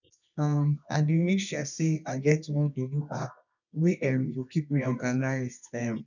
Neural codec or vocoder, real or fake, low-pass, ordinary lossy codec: codec, 24 kHz, 0.9 kbps, WavTokenizer, medium music audio release; fake; 7.2 kHz; none